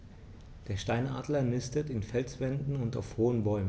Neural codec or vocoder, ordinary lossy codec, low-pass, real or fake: none; none; none; real